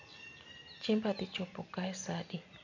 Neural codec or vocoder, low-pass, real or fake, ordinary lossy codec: none; 7.2 kHz; real; none